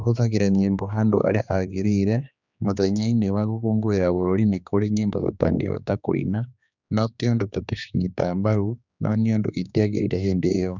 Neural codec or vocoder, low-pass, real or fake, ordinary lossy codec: codec, 16 kHz, 2 kbps, X-Codec, HuBERT features, trained on general audio; 7.2 kHz; fake; none